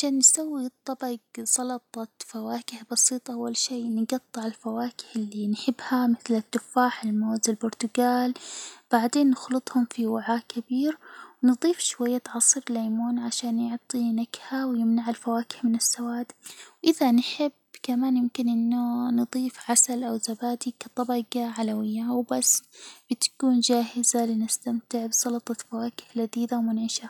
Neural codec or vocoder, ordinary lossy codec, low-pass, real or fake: none; none; 19.8 kHz; real